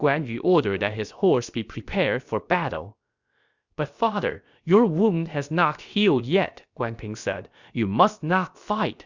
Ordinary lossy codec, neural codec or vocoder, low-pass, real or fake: Opus, 64 kbps; codec, 16 kHz, about 1 kbps, DyCAST, with the encoder's durations; 7.2 kHz; fake